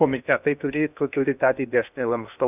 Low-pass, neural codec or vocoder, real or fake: 3.6 kHz; codec, 16 kHz in and 24 kHz out, 0.6 kbps, FocalCodec, streaming, 2048 codes; fake